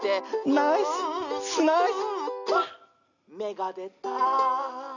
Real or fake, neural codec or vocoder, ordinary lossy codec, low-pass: real; none; none; 7.2 kHz